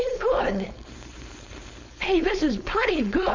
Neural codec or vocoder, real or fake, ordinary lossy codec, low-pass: codec, 16 kHz, 4.8 kbps, FACodec; fake; MP3, 64 kbps; 7.2 kHz